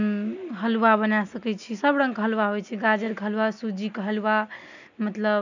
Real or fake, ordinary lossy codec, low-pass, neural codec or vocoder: real; none; 7.2 kHz; none